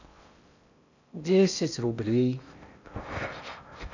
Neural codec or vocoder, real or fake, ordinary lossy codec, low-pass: codec, 16 kHz in and 24 kHz out, 0.6 kbps, FocalCodec, streaming, 4096 codes; fake; none; 7.2 kHz